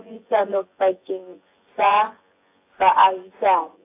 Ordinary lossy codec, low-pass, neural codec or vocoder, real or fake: AAC, 24 kbps; 3.6 kHz; vocoder, 24 kHz, 100 mel bands, Vocos; fake